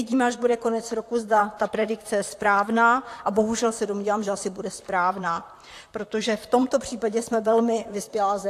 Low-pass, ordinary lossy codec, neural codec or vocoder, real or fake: 14.4 kHz; AAC, 64 kbps; vocoder, 44.1 kHz, 128 mel bands, Pupu-Vocoder; fake